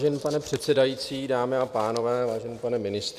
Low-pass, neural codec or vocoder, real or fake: 14.4 kHz; none; real